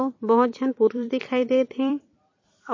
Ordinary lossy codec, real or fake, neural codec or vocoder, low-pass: MP3, 32 kbps; fake; vocoder, 44.1 kHz, 128 mel bands every 256 samples, BigVGAN v2; 7.2 kHz